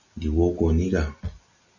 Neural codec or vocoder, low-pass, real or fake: none; 7.2 kHz; real